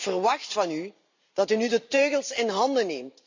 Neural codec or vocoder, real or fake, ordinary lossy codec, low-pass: vocoder, 44.1 kHz, 128 mel bands every 512 samples, BigVGAN v2; fake; AAC, 48 kbps; 7.2 kHz